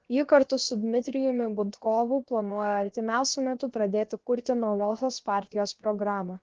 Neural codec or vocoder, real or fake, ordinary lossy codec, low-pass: codec, 16 kHz, 0.7 kbps, FocalCodec; fake; Opus, 16 kbps; 7.2 kHz